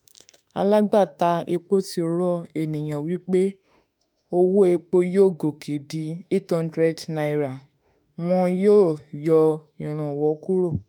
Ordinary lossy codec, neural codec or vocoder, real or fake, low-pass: none; autoencoder, 48 kHz, 32 numbers a frame, DAC-VAE, trained on Japanese speech; fake; 19.8 kHz